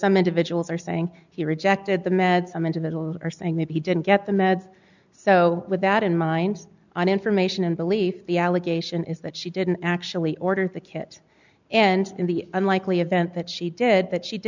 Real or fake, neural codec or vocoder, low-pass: real; none; 7.2 kHz